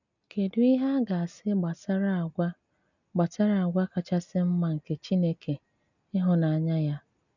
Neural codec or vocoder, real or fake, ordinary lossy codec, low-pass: none; real; none; 7.2 kHz